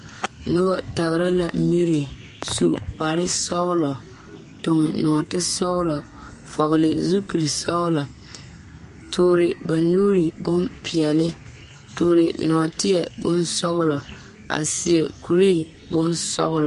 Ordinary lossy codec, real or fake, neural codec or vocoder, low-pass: MP3, 48 kbps; fake; codec, 32 kHz, 1.9 kbps, SNAC; 14.4 kHz